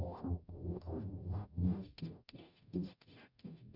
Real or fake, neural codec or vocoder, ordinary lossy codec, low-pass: fake; codec, 44.1 kHz, 0.9 kbps, DAC; none; 5.4 kHz